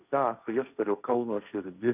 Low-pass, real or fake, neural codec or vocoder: 3.6 kHz; fake; codec, 16 kHz, 1.1 kbps, Voila-Tokenizer